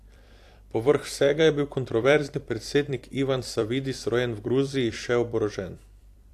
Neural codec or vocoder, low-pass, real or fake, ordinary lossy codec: vocoder, 44.1 kHz, 128 mel bands every 256 samples, BigVGAN v2; 14.4 kHz; fake; AAC, 64 kbps